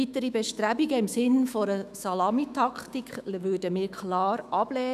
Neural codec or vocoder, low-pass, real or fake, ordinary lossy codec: autoencoder, 48 kHz, 128 numbers a frame, DAC-VAE, trained on Japanese speech; 14.4 kHz; fake; none